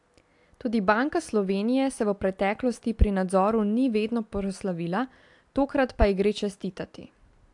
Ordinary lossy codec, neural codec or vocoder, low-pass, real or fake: AAC, 64 kbps; none; 10.8 kHz; real